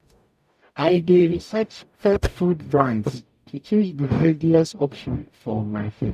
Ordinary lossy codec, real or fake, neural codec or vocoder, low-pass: none; fake; codec, 44.1 kHz, 0.9 kbps, DAC; 14.4 kHz